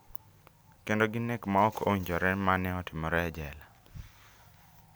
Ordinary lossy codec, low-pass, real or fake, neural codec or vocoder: none; none; real; none